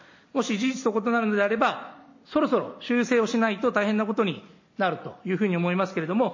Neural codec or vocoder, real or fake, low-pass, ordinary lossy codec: none; real; 7.2 kHz; MP3, 32 kbps